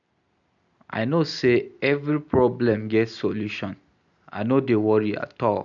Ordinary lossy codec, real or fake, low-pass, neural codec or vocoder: none; real; 7.2 kHz; none